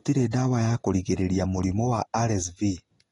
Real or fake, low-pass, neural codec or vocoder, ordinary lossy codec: real; 10.8 kHz; none; AAC, 32 kbps